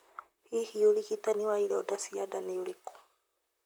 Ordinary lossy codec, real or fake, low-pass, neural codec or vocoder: none; fake; none; vocoder, 44.1 kHz, 128 mel bands, Pupu-Vocoder